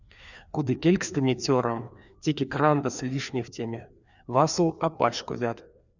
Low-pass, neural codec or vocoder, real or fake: 7.2 kHz; codec, 16 kHz, 2 kbps, FreqCodec, larger model; fake